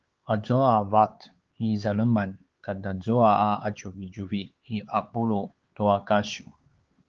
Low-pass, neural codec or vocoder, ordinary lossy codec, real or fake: 7.2 kHz; codec, 16 kHz, 4 kbps, X-Codec, HuBERT features, trained on LibriSpeech; Opus, 16 kbps; fake